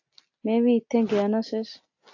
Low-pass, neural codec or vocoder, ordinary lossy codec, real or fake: 7.2 kHz; none; AAC, 48 kbps; real